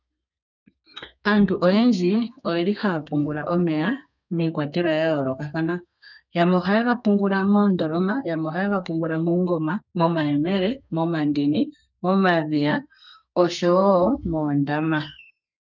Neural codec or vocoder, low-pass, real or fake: codec, 32 kHz, 1.9 kbps, SNAC; 7.2 kHz; fake